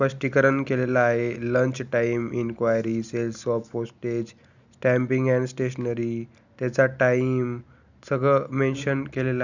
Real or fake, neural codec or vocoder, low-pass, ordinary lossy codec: real; none; 7.2 kHz; none